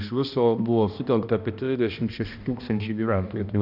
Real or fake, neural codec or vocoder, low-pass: fake; codec, 16 kHz, 1 kbps, X-Codec, HuBERT features, trained on balanced general audio; 5.4 kHz